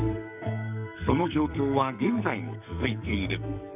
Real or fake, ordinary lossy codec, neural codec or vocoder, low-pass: fake; none; codec, 44.1 kHz, 2.6 kbps, SNAC; 3.6 kHz